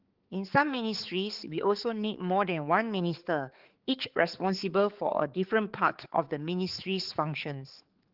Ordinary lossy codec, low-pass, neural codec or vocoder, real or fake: Opus, 16 kbps; 5.4 kHz; codec, 16 kHz, 4 kbps, X-Codec, HuBERT features, trained on balanced general audio; fake